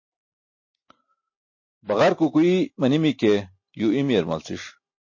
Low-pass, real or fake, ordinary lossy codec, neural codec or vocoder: 7.2 kHz; real; MP3, 32 kbps; none